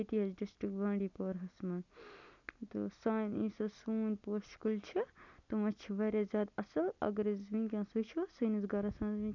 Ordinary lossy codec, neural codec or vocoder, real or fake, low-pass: none; none; real; 7.2 kHz